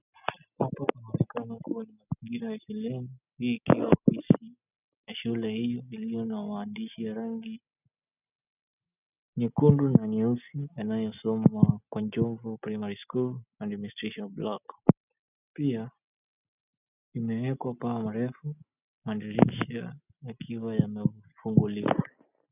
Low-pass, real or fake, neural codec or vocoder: 3.6 kHz; real; none